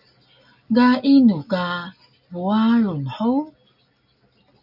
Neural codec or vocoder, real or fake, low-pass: none; real; 5.4 kHz